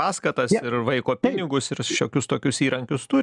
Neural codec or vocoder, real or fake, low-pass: none; real; 10.8 kHz